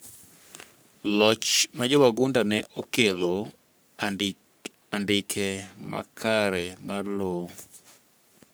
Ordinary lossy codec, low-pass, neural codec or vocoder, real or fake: none; none; codec, 44.1 kHz, 3.4 kbps, Pupu-Codec; fake